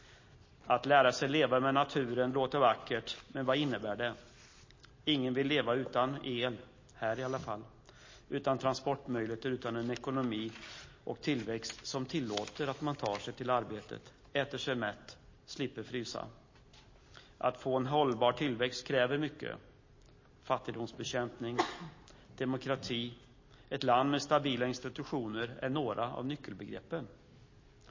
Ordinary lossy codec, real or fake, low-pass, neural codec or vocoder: MP3, 32 kbps; real; 7.2 kHz; none